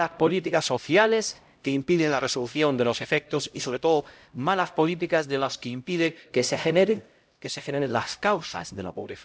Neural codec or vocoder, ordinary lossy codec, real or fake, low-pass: codec, 16 kHz, 0.5 kbps, X-Codec, HuBERT features, trained on LibriSpeech; none; fake; none